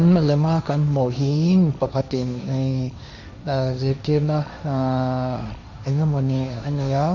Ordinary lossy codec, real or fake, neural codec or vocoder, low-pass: none; fake; codec, 16 kHz, 1.1 kbps, Voila-Tokenizer; 7.2 kHz